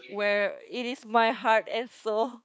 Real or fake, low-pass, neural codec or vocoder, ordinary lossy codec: fake; none; codec, 16 kHz, 4 kbps, X-Codec, HuBERT features, trained on balanced general audio; none